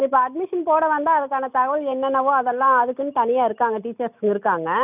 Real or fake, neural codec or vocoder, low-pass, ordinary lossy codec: real; none; 3.6 kHz; none